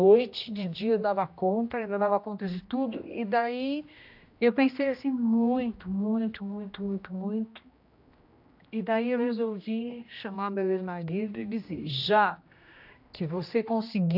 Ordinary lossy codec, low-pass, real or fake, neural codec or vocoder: AAC, 48 kbps; 5.4 kHz; fake; codec, 16 kHz, 1 kbps, X-Codec, HuBERT features, trained on general audio